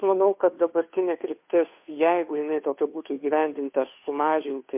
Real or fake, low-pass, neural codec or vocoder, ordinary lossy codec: fake; 3.6 kHz; codec, 16 kHz, 2 kbps, FunCodec, trained on Chinese and English, 25 frames a second; MP3, 32 kbps